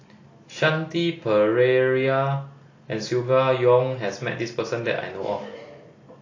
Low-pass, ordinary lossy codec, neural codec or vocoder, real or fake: 7.2 kHz; AAC, 32 kbps; none; real